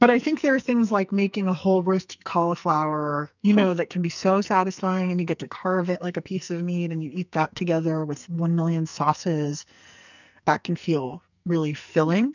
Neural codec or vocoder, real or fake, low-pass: codec, 44.1 kHz, 2.6 kbps, SNAC; fake; 7.2 kHz